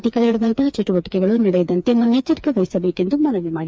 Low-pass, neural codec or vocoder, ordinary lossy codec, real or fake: none; codec, 16 kHz, 4 kbps, FreqCodec, smaller model; none; fake